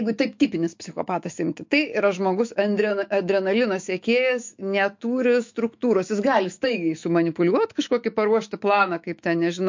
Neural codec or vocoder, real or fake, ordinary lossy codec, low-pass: autoencoder, 48 kHz, 128 numbers a frame, DAC-VAE, trained on Japanese speech; fake; MP3, 48 kbps; 7.2 kHz